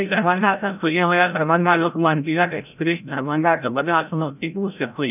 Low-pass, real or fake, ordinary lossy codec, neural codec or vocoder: 3.6 kHz; fake; none; codec, 16 kHz, 0.5 kbps, FreqCodec, larger model